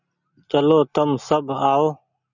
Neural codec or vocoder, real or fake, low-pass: none; real; 7.2 kHz